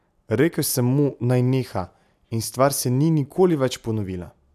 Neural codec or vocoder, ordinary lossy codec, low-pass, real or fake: none; none; 14.4 kHz; real